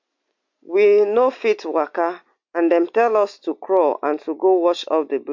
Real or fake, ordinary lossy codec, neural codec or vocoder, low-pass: real; MP3, 48 kbps; none; 7.2 kHz